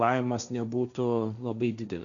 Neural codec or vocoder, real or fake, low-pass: codec, 16 kHz, 1.1 kbps, Voila-Tokenizer; fake; 7.2 kHz